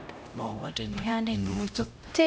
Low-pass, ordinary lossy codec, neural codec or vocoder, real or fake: none; none; codec, 16 kHz, 0.5 kbps, X-Codec, HuBERT features, trained on LibriSpeech; fake